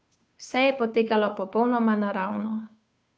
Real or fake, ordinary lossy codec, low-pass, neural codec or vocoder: fake; none; none; codec, 16 kHz, 2 kbps, FunCodec, trained on Chinese and English, 25 frames a second